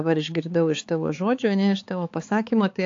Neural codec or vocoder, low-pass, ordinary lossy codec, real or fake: codec, 16 kHz, 4 kbps, X-Codec, HuBERT features, trained on balanced general audio; 7.2 kHz; AAC, 48 kbps; fake